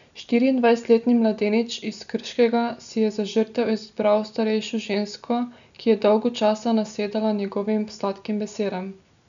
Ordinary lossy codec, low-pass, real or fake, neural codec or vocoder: none; 7.2 kHz; real; none